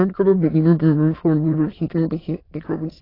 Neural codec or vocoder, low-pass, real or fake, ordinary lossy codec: autoencoder, 22.05 kHz, a latent of 192 numbers a frame, VITS, trained on many speakers; 5.4 kHz; fake; AAC, 24 kbps